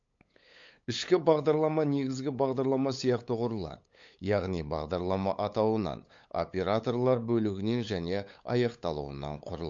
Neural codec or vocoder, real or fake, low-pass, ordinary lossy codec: codec, 16 kHz, 8 kbps, FunCodec, trained on LibriTTS, 25 frames a second; fake; 7.2 kHz; MP3, 48 kbps